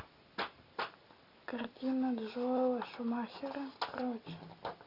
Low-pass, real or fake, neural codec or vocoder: 5.4 kHz; real; none